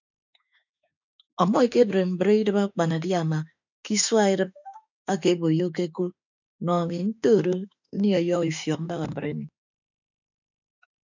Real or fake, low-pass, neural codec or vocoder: fake; 7.2 kHz; autoencoder, 48 kHz, 32 numbers a frame, DAC-VAE, trained on Japanese speech